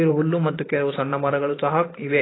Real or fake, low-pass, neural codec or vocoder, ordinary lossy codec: fake; 7.2 kHz; vocoder, 44.1 kHz, 128 mel bands every 512 samples, BigVGAN v2; AAC, 16 kbps